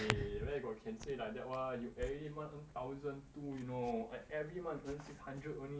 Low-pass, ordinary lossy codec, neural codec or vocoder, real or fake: none; none; none; real